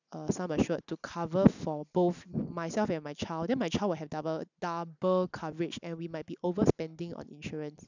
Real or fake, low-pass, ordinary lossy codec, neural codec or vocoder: real; 7.2 kHz; none; none